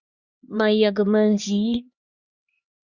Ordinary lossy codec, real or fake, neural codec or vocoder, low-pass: Opus, 64 kbps; fake; codec, 16 kHz, 2 kbps, X-Codec, HuBERT features, trained on LibriSpeech; 7.2 kHz